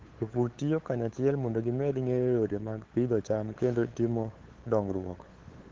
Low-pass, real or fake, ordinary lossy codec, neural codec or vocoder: 7.2 kHz; fake; Opus, 16 kbps; codec, 16 kHz, 8 kbps, FunCodec, trained on LibriTTS, 25 frames a second